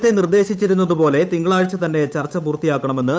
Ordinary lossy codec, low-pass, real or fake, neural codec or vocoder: none; none; fake; codec, 16 kHz, 8 kbps, FunCodec, trained on Chinese and English, 25 frames a second